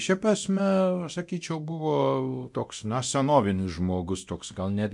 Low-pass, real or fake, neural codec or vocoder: 10.8 kHz; fake; codec, 24 kHz, 0.9 kbps, DualCodec